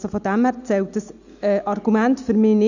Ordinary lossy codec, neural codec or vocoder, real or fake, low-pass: none; none; real; 7.2 kHz